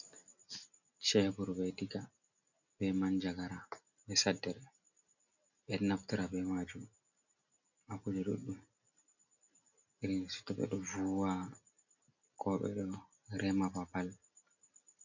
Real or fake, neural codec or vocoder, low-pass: real; none; 7.2 kHz